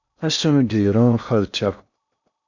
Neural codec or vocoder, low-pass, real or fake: codec, 16 kHz in and 24 kHz out, 0.6 kbps, FocalCodec, streaming, 2048 codes; 7.2 kHz; fake